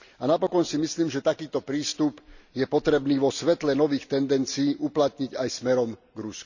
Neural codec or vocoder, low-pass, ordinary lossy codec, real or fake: none; 7.2 kHz; none; real